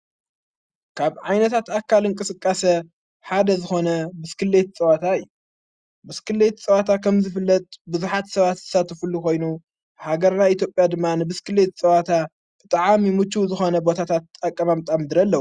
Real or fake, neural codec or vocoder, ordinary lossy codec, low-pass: real; none; Opus, 64 kbps; 9.9 kHz